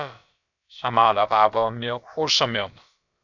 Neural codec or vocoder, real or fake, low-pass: codec, 16 kHz, about 1 kbps, DyCAST, with the encoder's durations; fake; 7.2 kHz